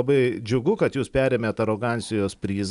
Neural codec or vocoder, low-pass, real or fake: none; 10.8 kHz; real